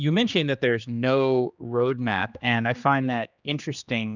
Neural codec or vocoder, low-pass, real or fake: codec, 16 kHz, 2 kbps, X-Codec, HuBERT features, trained on general audio; 7.2 kHz; fake